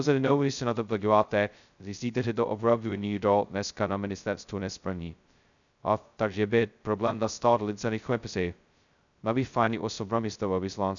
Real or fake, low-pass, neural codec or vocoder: fake; 7.2 kHz; codec, 16 kHz, 0.2 kbps, FocalCodec